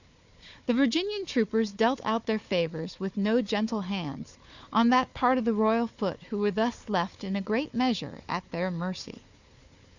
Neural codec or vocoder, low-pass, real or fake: codec, 16 kHz, 4 kbps, FunCodec, trained on Chinese and English, 50 frames a second; 7.2 kHz; fake